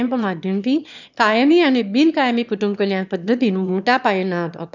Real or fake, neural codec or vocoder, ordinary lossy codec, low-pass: fake; autoencoder, 22.05 kHz, a latent of 192 numbers a frame, VITS, trained on one speaker; none; 7.2 kHz